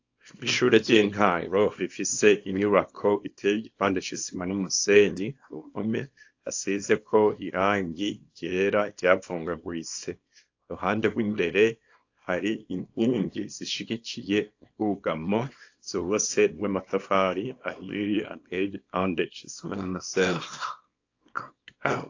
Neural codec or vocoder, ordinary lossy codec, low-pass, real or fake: codec, 24 kHz, 0.9 kbps, WavTokenizer, small release; AAC, 48 kbps; 7.2 kHz; fake